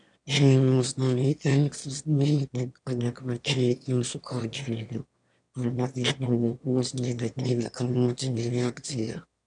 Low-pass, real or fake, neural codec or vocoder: 9.9 kHz; fake; autoencoder, 22.05 kHz, a latent of 192 numbers a frame, VITS, trained on one speaker